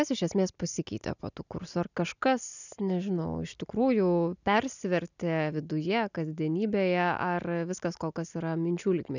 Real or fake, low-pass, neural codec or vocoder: real; 7.2 kHz; none